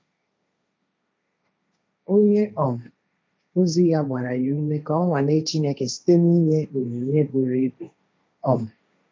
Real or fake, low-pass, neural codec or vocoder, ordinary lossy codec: fake; none; codec, 16 kHz, 1.1 kbps, Voila-Tokenizer; none